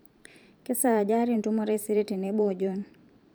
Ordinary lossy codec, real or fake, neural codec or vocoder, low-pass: none; fake; vocoder, 44.1 kHz, 128 mel bands every 512 samples, BigVGAN v2; none